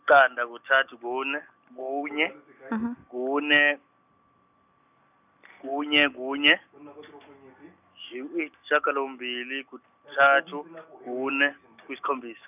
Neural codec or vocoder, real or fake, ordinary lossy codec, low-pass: none; real; none; 3.6 kHz